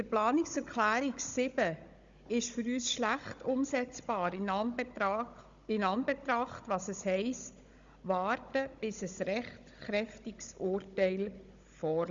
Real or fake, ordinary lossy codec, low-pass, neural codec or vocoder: fake; Opus, 64 kbps; 7.2 kHz; codec, 16 kHz, 16 kbps, FunCodec, trained on Chinese and English, 50 frames a second